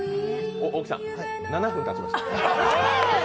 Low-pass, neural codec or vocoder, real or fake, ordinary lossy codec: none; none; real; none